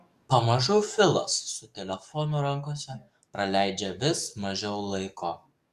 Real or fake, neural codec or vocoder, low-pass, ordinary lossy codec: fake; codec, 44.1 kHz, 7.8 kbps, DAC; 14.4 kHz; Opus, 64 kbps